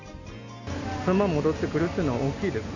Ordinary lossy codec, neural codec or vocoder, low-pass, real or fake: none; none; 7.2 kHz; real